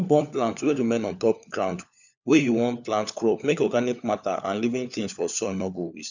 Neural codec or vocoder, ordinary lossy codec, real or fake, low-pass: codec, 16 kHz, 4 kbps, FunCodec, trained on LibriTTS, 50 frames a second; none; fake; 7.2 kHz